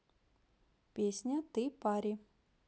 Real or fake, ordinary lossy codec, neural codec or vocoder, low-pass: real; none; none; none